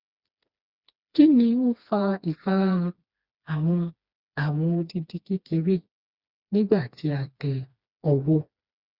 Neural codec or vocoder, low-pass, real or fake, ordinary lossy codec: codec, 16 kHz, 2 kbps, FreqCodec, smaller model; 5.4 kHz; fake; Opus, 64 kbps